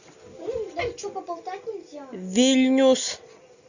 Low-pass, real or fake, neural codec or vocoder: 7.2 kHz; real; none